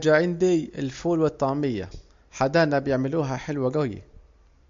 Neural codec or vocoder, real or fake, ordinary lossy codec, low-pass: none; real; MP3, 48 kbps; 7.2 kHz